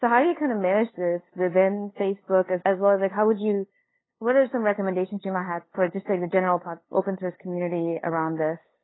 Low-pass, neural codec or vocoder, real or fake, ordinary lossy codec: 7.2 kHz; codec, 16 kHz, 2 kbps, FunCodec, trained on LibriTTS, 25 frames a second; fake; AAC, 16 kbps